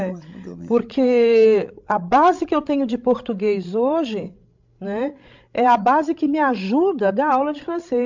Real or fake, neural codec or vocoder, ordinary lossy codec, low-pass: fake; codec, 16 kHz, 16 kbps, FreqCodec, larger model; MP3, 64 kbps; 7.2 kHz